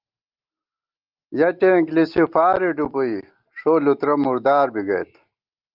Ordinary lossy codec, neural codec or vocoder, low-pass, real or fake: Opus, 32 kbps; none; 5.4 kHz; real